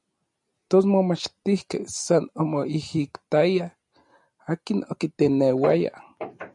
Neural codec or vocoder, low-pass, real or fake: none; 10.8 kHz; real